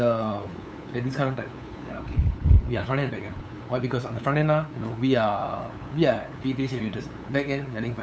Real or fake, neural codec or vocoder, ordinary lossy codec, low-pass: fake; codec, 16 kHz, 8 kbps, FunCodec, trained on LibriTTS, 25 frames a second; none; none